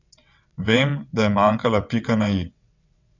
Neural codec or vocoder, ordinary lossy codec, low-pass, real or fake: vocoder, 22.05 kHz, 80 mel bands, WaveNeXt; none; 7.2 kHz; fake